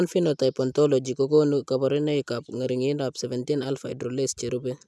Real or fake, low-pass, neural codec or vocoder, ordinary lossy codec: real; none; none; none